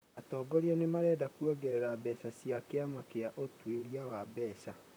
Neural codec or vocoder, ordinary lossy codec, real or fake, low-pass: vocoder, 44.1 kHz, 128 mel bands, Pupu-Vocoder; none; fake; none